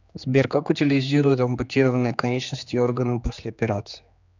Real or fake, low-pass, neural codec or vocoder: fake; 7.2 kHz; codec, 16 kHz, 2 kbps, X-Codec, HuBERT features, trained on general audio